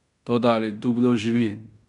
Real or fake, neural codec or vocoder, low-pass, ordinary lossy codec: fake; codec, 16 kHz in and 24 kHz out, 0.9 kbps, LongCat-Audio-Codec, fine tuned four codebook decoder; 10.8 kHz; none